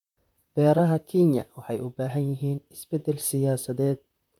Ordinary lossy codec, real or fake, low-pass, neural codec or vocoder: none; fake; 19.8 kHz; vocoder, 44.1 kHz, 128 mel bands, Pupu-Vocoder